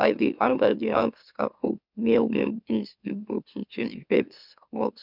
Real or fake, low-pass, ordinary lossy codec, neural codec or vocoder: fake; 5.4 kHz; none; autoencoder, 44.1 kHz, a latent of 192 numbers a frame, MeloTTS